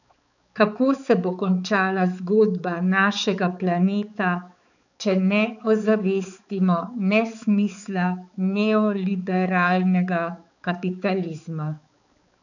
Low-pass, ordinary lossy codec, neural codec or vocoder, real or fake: 7.2 kHz; none; codec, 16 kHz, 4 kbps, X-Codec, HuBERT features, trained on balanced general audio; fake